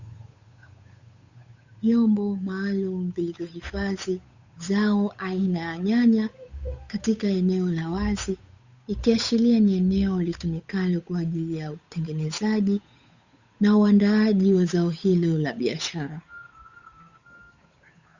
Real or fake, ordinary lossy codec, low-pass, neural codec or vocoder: fake; Opus, 64 kbps; 7.2 kHz; codec, 16 kHz, 8 kbps, FunCodec, trained on Chinese and English, 25 frames a second